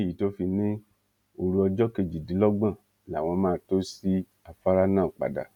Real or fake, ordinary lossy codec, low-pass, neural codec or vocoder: real; none; 19.8 kHz; none